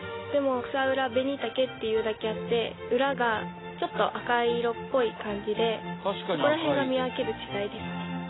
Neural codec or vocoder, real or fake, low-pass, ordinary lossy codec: none; real; 7.2 kHz; AAC, 16 kbps